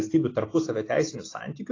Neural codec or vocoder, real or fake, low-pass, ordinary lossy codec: none; real; 7.2 kHz; AAC, 32 kbps